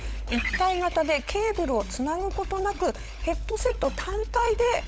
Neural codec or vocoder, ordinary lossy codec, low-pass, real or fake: codec, 16 kHz, 16 kbps, FunCodec, trained on LibriTTS, 50 frames a second; none; none; fake